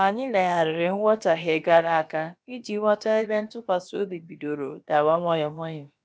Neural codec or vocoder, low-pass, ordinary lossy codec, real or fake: codec, 16 kHz, about 1 kbps, DyCAST, with the encoder's durations; none; none; fake